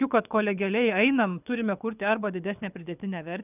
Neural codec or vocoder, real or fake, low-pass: codec, 24 kHz, 6 kbps, HILCodec; fake; 3.6 kHz